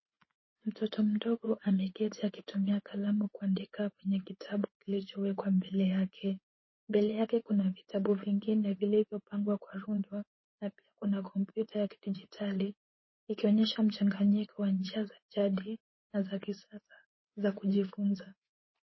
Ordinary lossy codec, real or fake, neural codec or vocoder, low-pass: MP3, 24 kbps; fake; vocoder, 24 kHz, 100 mel bands, Vocos; 7.2 kHz